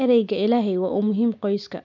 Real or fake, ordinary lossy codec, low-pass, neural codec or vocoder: real; none; 7.2 kHz; none